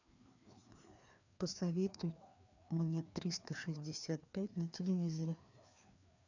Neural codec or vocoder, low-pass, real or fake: codec, 16 kHz, 2 kbps, FreqCodec, larger model; 7.2 kHz; fake